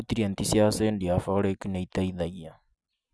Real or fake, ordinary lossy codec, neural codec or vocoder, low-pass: real; none; none; none